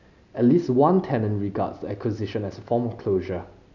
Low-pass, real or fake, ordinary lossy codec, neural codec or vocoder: 7.2 kHz; real; none; none